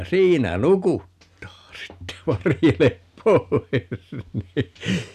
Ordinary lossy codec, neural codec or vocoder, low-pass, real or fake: none; none; 14.4 kHz; real